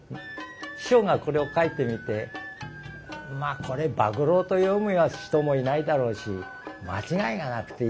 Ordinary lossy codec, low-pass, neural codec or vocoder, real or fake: none; none; none; real